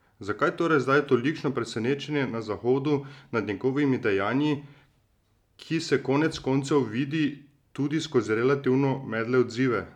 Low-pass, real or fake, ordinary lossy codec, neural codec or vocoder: 19.8 kHz; real; none; none